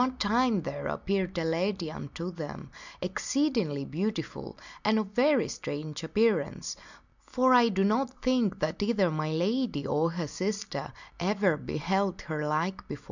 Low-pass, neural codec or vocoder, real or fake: 7.2 kHz; none; real